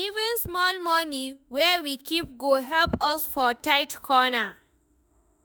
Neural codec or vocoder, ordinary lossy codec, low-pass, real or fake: autoencoder, 48 kHz, 32 numbers a frame, DAC-VAE, trained on Japanese speech; none; none; fake